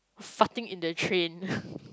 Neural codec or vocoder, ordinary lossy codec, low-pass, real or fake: none; none; none; real